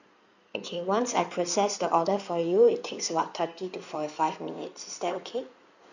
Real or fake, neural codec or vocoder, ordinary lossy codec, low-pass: fake; codec, 16 kHz in and 24 kHz out, 2.2 kbps, FireRedTTS-2 codec; none; 7.2 kHz